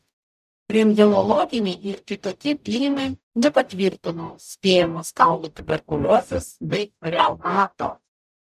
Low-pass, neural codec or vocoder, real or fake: 14.4 kHz; codec, 44.1 kHz, 0.9 kbps, DAC; fake